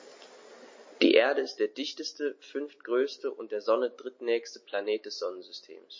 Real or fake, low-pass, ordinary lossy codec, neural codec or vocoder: real; 7.2 kHz; MP3, 32 kbps; none